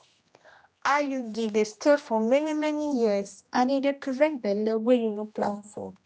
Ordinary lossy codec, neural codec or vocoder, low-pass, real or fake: none; codec, 16 kHz, 1 kbps, X-Codec, HuBERT features, trained on general audio; none; fake